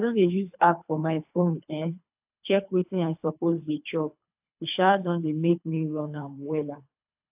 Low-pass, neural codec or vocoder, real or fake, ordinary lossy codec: 3.6 kHz; codec, 24 kHz, 3 kbps, HILCodec; fake; AAC, 32 kbps